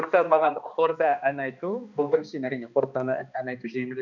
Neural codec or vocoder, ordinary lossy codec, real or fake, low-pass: codec, 16 kHz, 2 kbps, X-Codec, HuBERT features, trained on balanced general audio; none; fake; 7.2 kHz